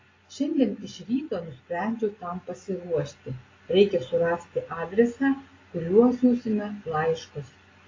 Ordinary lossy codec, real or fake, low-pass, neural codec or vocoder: AAC, 32 kbps; real; 7.2 kHz; none